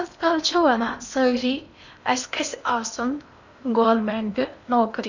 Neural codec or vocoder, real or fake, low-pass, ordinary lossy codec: codec, 16 kHz in and 24 kHz out, 0.8 kbps, FocalCodec, streaming, 65536 codes; fake; 7.2 kHz; none